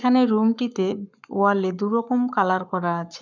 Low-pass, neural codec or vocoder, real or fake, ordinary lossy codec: 7.2 kHz; codec, 44.1 kHz, 7.8 kbps, Pupu-Codec; fake; none